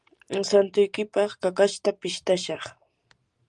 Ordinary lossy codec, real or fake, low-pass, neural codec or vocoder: Opus, 32 kbps; real; 10.8 kHz; none